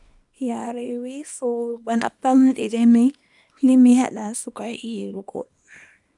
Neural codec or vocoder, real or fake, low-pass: codec, 24 kHz, 0.9 kbps, WavTokenizer, small release; fake; 10.8 kHz